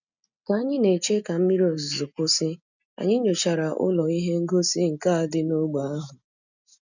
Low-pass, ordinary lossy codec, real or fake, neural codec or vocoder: 7.2 kHz; none; real; none